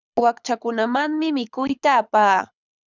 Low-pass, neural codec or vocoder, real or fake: 7.2 kHz; codec, 24 kHz, 6 kbps, HILCodec; fake